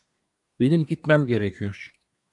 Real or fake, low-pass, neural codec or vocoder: fake; 10.8 kHz; codec, 24 kHz, 1 kbps, SNAC